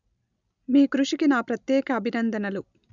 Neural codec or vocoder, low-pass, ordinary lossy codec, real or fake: none; 7.2 kHz; none; real